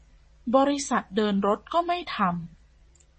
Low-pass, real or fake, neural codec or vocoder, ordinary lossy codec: 9.9 kHz; fake; vocoder, 22.05 kHz, 80 mel bands, WaveNeXt; MP3, 32 kbps